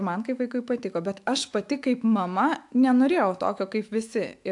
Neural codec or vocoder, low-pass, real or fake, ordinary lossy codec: autoencoder, 48 kHz, 128 numbers a frame, DAC-VAE, trained on Japanese speech; 10.8 kHz; fake; AAC, 64 kbps